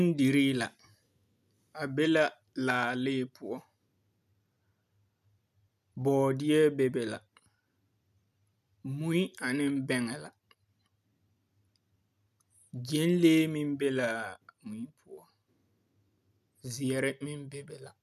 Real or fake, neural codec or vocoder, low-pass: real; none; 14.4 kHz